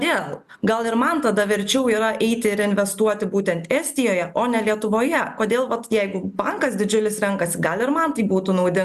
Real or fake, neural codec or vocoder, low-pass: real; none; 14.4 kHz